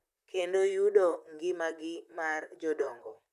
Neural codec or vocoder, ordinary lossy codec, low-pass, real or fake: vocoder, 44.1 kHz, 128 mel bands, Pupu-Vocoder; none; 14.4 kHz; fake